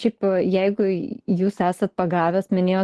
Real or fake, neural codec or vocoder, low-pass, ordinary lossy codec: real; none; 10.8 kHz; Opus, 16 kbps